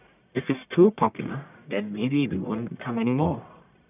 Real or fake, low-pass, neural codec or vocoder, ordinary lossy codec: fake; 3.6 kHz; codec, 44.1 kHz, 1.7 kbps, Pupu-Codec; none